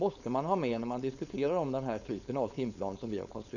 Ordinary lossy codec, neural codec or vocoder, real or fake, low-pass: none; codec, 16 kHz, 4.8 kbps, FACodec; fake; 7.2 kHz